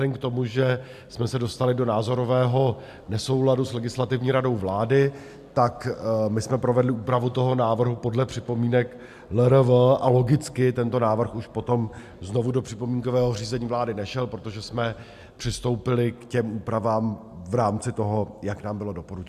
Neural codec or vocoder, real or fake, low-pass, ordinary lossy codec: none; real; 14.4 kHz; MP3, 96 kbps